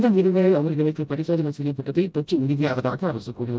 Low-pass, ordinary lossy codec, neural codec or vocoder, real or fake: none; none; codec, 16 kHz, 0.5 kbps, FreqCodec, smaller model; fake